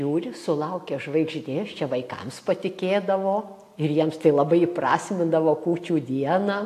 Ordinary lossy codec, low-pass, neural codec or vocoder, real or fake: AAC, 64 kbps; 14.4 kHz; none; real